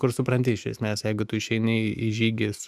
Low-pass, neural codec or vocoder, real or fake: 14.4 kHz; autoencoder, 48 kHz, 128 numbers a frame, DAC-VAE, trained on Japanese speech; fake